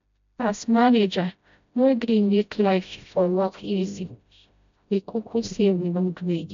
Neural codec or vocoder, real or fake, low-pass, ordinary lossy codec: codec, 16 kHz, 0.5 kbps, FreqCodec, smaller model; fake; 7.2 kHz; MP3, 64 kbps